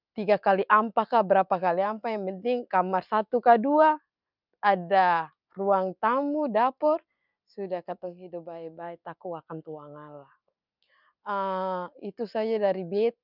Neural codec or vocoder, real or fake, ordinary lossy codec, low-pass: none; real; none; 5.4 kHz